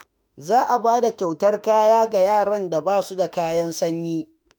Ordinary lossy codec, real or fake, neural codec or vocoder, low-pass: none; fake; autoencoder, 48 kHz, 32 numbers a frame, DAC-VAE, trained on Japanese speech; none